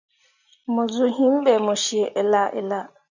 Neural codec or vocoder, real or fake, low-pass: none; real; 7.2 kHz